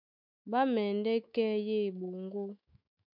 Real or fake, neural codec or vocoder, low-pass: fake; autoencoder, 48 kHz, 128 numbers a frame, DAC-VAE, trained on Japanese speech; 5.4 kHz